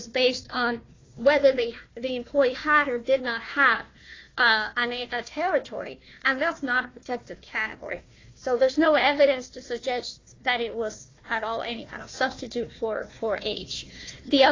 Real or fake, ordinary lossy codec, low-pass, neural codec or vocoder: fake; AAC, 32 kbps; 7.2 kHz; codec, 16 kHz, 1 kbps, FunCodec, trained on Chinese and English, 50 frames a second